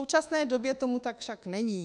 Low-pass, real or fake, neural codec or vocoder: 10.8 kHz; fake; codec, 24 kHz, 1.2 kbps, DualCodec